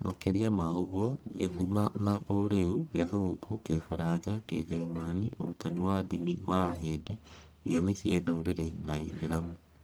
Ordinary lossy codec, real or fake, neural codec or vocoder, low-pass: none; fake; codec, 44.1 kHz, 1.7 kbps, Pupu-Codec; none